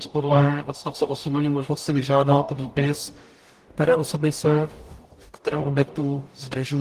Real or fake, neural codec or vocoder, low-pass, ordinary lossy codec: fake; codec, 44.1 kHz, 0.9 kbps, DAC; 14.4 kHz; Opus, 24 kbps